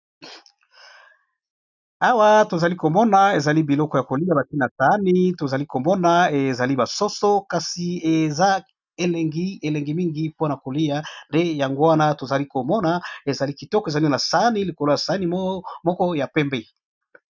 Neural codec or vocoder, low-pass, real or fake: none; 7.2 kHz; real